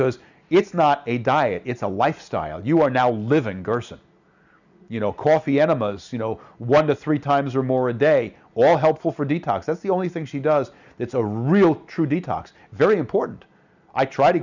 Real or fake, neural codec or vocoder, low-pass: real; none; 7.2 kHz